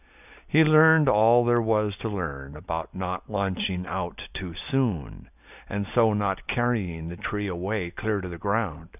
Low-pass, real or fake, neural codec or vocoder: 3.6 kHz; real; none